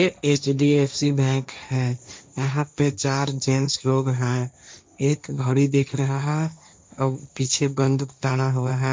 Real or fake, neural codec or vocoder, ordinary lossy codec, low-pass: fake; codec, 16 kHz, 1.1 kbps, Voila-Tokenizer; none; none